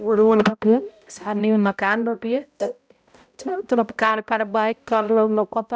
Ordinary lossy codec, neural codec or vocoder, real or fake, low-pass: none; codec, 16 kHz, 0.5 kbps, X-Codec, HuBERT features, trained on balanced general audio; fake; none